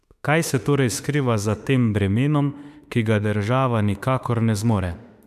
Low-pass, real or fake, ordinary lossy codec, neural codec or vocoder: 14.4 kHz; fake; none; autoencoder, 48 kHz, 32 numbers a frame, DAC-VAE, trained on Japanese speech